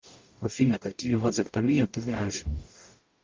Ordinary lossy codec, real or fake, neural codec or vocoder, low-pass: Opus, 24 kbps; fake; codec, 44.1 kHz, 0.9 kbps, DAC; 7.2 kHz